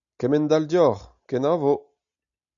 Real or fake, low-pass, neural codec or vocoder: real; 7.2 kHz; none